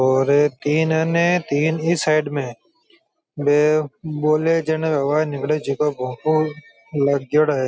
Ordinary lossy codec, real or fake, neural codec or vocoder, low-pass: none; real; none; none